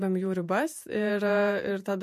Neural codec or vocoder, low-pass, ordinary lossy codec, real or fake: vocoder, 48 kHz, 128 mel bands, Vocos; 14.4 kHz; MP3, 64 kbps; fake